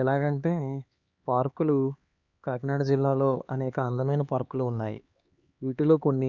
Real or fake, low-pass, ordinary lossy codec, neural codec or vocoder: fake; 7.2 kHz; Opus, 64 kbps; codec, 16 kHz, 2 kbps, X-Codec, HuBERT features, trained on LibriSpeech